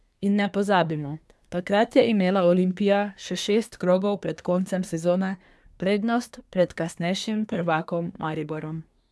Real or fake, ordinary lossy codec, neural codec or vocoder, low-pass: fake; none; codec, 24 kHz, 1 kbps, SNAC; none